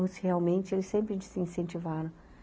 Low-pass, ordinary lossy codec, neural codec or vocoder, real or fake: none; none; none; real